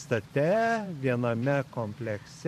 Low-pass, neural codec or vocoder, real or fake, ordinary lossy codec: 14.4 kHz; vocoder, 44.1 kHz, 128 mel bands every 512 samples, BigVGAN v2; fake; MP3, 64 kbps